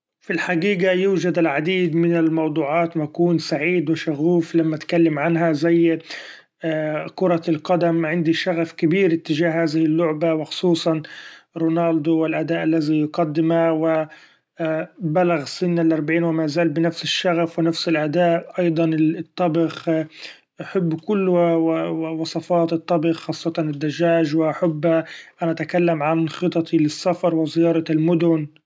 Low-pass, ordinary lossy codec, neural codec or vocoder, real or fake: none; none; none; real